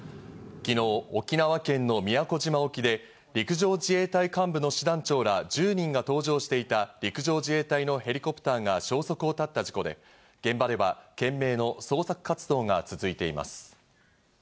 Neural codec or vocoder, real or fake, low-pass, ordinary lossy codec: none; real; none; none